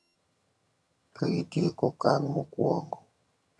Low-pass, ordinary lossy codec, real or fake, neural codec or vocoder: none; none; fake; vocoder, 22.05 kHz, 80 mel bands, HiFi-GAN